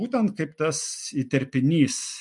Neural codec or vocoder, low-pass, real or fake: none; 10.8 kHz; real